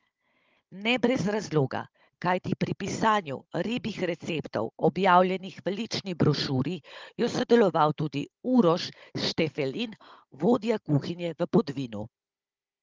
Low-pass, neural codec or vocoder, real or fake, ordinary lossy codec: 7.2 kHz; codec, 16 kHz, 16 kbps, FunCodec, trained on Chinese and English, 50 frames a second; fake; Opus, 32 kbps